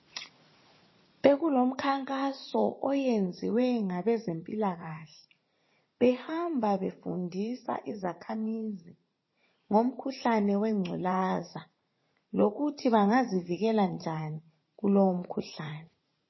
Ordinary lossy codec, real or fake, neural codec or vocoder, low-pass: MP3, 24 kbps; fake; vocoder, 44.1 kHz, 80 mel bands, Vocos; 7.2 kHz